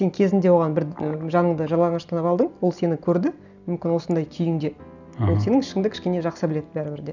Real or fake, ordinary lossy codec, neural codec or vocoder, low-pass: real; none; none; 7.2 kHz